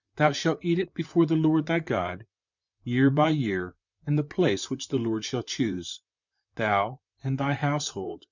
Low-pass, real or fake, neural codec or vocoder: 7.2 kHz; fake; vocoder, 44.1 kHz, 128 mel bands, Pupu-Vocoder